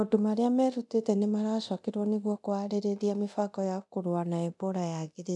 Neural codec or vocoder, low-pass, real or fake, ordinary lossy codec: codec, 24 kHz, 0.9 kbps, DualCodec; 10.8 kHz; fake; none